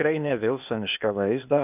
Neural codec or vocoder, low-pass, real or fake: codec, 16 kHz, 0.8 kbps, ZipCodec; 3.6 kHz; fake